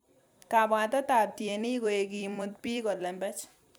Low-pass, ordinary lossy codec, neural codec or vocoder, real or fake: none; none; vocoder, 44.1 kHz, 128 mel bands every 256 samples, BigVGAN v2; fake